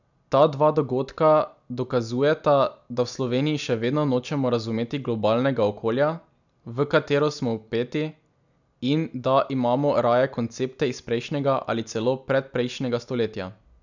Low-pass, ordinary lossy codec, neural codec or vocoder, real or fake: 7.2 kHz; none; none; real